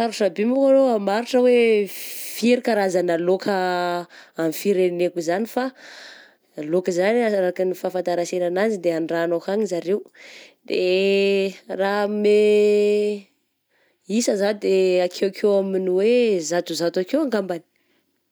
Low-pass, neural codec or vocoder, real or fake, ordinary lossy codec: none; none; real; none